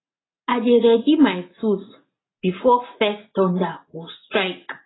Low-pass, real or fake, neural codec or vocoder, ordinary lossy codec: 7.2 kHz; real; none; AAC, 16 kbps